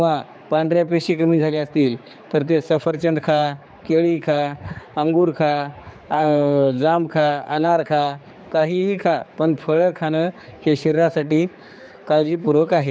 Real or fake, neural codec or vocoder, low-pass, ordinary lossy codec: fake; codec, 16 kHz, 4 kbps, X-Codec, HuBERT features, trained on general audio; none; none